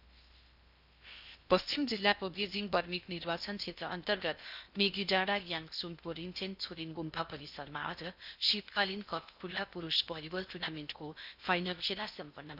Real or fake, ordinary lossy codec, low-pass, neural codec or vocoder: fake; none; 5.4 kHz; codec, 16 kHz in and 24 kHz out, 0.6 kbps, FocalCodec, streaming, 2048 codes